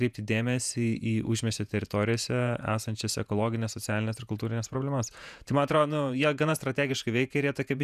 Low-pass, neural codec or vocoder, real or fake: 14.4 kHz; vocoder, 48 kHz, 128 mel bands, Vocos; fake